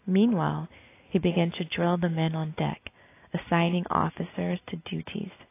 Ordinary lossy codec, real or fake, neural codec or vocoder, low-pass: AAC, 24 kbps; real; none; 3.6 kHz